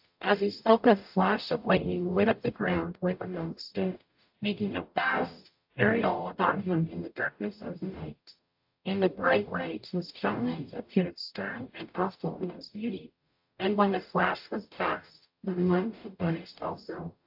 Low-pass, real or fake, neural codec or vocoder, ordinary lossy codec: 5.4 kHz; fake; codec, 44.1 kHz, 0.9 kbps, DAC; AAC, 48 kbps